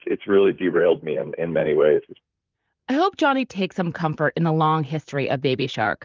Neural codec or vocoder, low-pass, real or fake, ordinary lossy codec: vocoder, 44.1 kHz, 128 mel bands, Pupu-Vocoder; 7.2 kHz; fake; Opus, 24 kbps